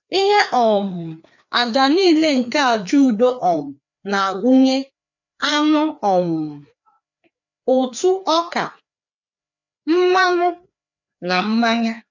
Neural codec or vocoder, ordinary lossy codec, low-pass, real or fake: codec, 16 kHz, 2 kbps, FreqCodec, larger model; none; 7.2 kHz; fake